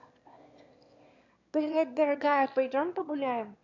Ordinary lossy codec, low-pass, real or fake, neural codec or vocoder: none; 7.2 kHz; fake; autoencoder, 22.05 kHz, a latent of 192 numbers a frame, VITS, trained on one speaker